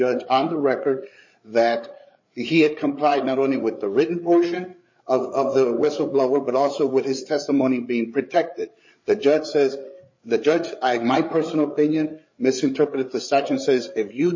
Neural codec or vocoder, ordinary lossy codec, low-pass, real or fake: codec, 16 kHz, 8 kbps, FreqCodec, larger model; MP3, 32 kbps; 7.2 kHz; fake